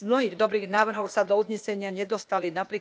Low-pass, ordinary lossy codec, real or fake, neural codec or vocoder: none; none; fake; codec, 16 kHz, 0.8 kbps, ZipCodec